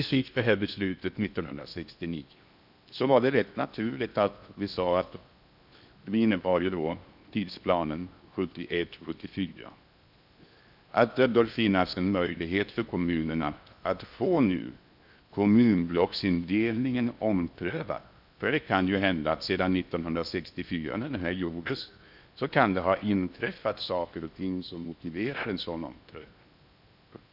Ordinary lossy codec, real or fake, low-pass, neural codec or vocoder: none; fake; 5.4 kHz; codec, 16 kHz in and 24 kHz out, 0.8 kbps, FocalCodec, streaming, 65536 codes